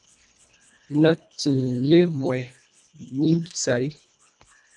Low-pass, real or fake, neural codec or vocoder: 10.8 kHz; fake; codec, 24 kHz, 1.5 kbps, HILCodec